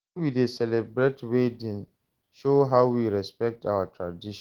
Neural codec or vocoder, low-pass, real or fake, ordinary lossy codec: autoencoder, 48 kHz, 128 numbers a frame, DAC-VAE, trained on Japanese speech; 19.8 kHz; fake; Opus, 16 kbps